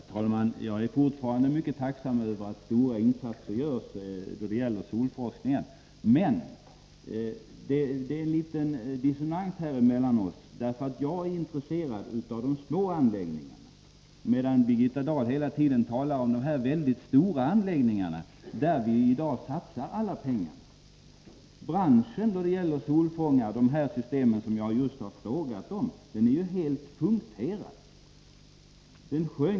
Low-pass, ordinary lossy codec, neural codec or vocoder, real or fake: none; none; none; real